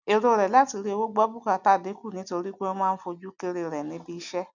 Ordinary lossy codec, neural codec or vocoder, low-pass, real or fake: none; none; 7.2 kHz; real